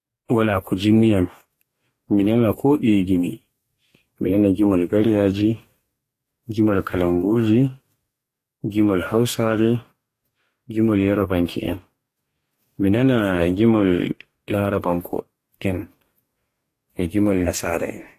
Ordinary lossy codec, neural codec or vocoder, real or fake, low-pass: AAC, 48 kbps; codec, 44.1 kHz, 2.6 kbps, DAC; fake; 14.4 kHz